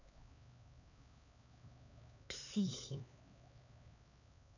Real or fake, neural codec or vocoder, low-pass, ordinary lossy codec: fake; codec, 16 kHz, 4 kbps, X-Codec, HuBERT features, trained on LibriSpeech; 7.2 kHz; none